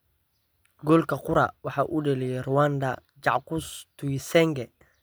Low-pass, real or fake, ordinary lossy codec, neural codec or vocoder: none; real; none; none